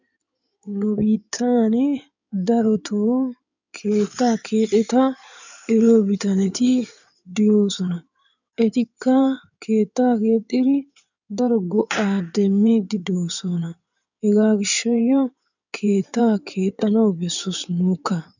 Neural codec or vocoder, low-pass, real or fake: codec, 16 kHz in and 24 kHz out, 2.2 kbps, FireRedTTS-2 codec; 7.2 kHz; fake